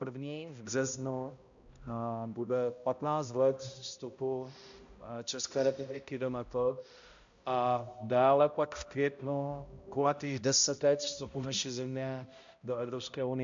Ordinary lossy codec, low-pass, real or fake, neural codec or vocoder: MP3, 64 kbps; 7.2 kHz; fake; codec, 16 kHz, 0.5 kbps, X-Codec, HuBERT features, trained on balanced general audio